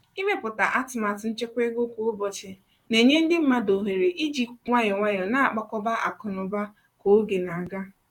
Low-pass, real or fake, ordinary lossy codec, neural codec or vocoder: 19.8 kHz; fake; none; vocoder, 44.1 kHz, 128 mel bands, Pupu-Vocoder